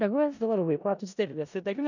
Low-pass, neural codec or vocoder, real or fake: 7.2 kHz; codec, 16 kHz in and 24 kHz out, 0.4 kbps, LongCat-Audio-Codec, four codebook decoder; fake